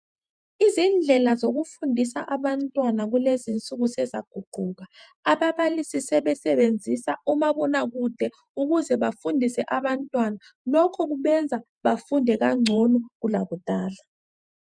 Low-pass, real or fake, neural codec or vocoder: 9.9 kHz; fake; vocoder, 48 kHz, 128 mel bands, Vocos